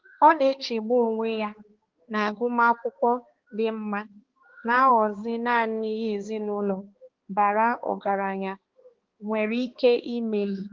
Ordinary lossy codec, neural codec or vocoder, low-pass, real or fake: Opus, 16 kbps; codec, 16 kHz, 2 kbps, X-Codec, HuBERT features, trained on balanced general audio; 7.2 kHz; fake